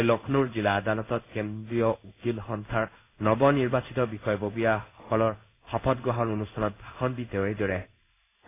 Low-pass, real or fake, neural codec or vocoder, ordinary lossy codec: 3.6 kHz; fake; codec, 16 kHz in and 24 kHz out, 1 kbps, XY-Tokenizer; AAC, 24 kbps